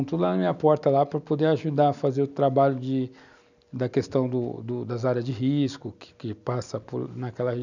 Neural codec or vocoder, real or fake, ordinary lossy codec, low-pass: none; real; none; 7.2 kHz